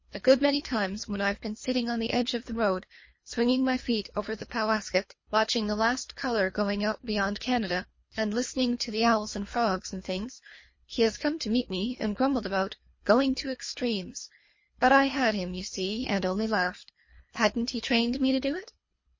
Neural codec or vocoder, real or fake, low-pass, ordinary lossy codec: codec, 24 kHz, 3 kbps, HILCodec; fake; 7.2 kHz; MP3, 32 kbps